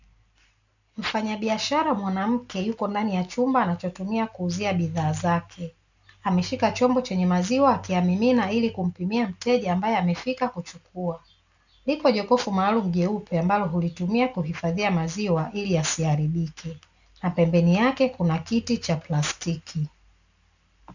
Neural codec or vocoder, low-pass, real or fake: none; 7.2 kHz; real